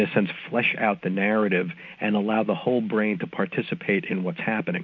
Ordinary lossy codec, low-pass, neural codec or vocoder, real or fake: MP3, 48 kbps; 7.2 kHz; none; real